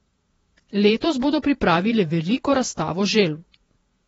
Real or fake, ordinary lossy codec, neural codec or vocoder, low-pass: fake; AAC, 24 kbps; vocoder, 48 kHz, 128 mel bands, Vocos; 19.8 kHz